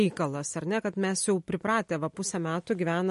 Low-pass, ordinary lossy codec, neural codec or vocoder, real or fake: 14.4 kHz; MP3, 48 kbps; none; real